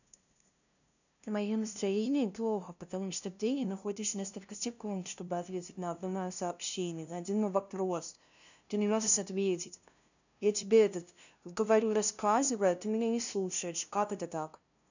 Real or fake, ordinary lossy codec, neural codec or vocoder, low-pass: fake; none; codec, 16 kHz, 0.5 kbps, FunCodec, trained on LibriTTS, 25 frames a second; 7.2 kHz